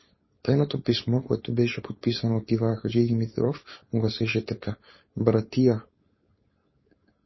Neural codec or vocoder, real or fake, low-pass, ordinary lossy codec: codec, 16 kHz, 4.8 kbps, FACodec; fake; 7.2 kHz; MP3, 24 kbps